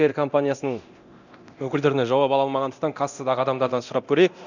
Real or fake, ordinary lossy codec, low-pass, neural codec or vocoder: fake; none; 7.2 kHz; codec, 24 kHz, 0.9 kbps, DualCodec